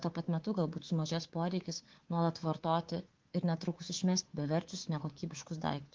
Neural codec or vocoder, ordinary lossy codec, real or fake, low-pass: codec, 24 kHz, 3.1 kbps, DualCodec; Opus, 16 kbps; fake; 7.2 kHz